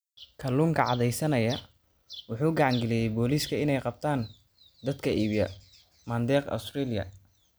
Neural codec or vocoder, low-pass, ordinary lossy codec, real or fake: none; none; none; real